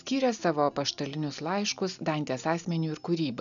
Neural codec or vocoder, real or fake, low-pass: none; real; 7.2 kHz